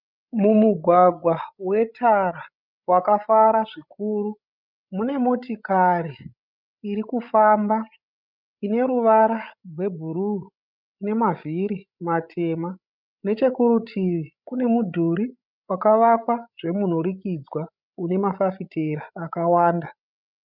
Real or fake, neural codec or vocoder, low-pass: fake; codec, 16 kHz, 16 kbps, FreqCodec, larger model; 5.4 kHz